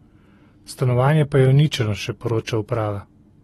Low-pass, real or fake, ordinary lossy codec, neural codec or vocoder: 19.8 kHz; real; AAC, 32 kbps; none